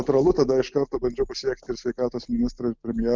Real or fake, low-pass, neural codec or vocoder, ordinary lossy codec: real; 7.2 kHz; none; Opus, 32 kbps